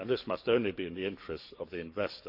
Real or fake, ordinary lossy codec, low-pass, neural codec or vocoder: fake; none; 5.4 kHz; codec, 44.1 kHz, 7.8 kbps, DAC